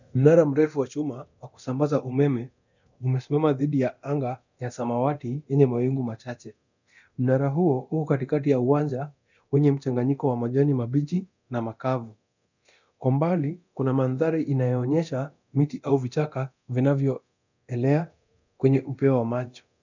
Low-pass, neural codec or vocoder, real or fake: 7.2 kHz; codec, 24 kHz, 0.9 kbps, DualCodec; fake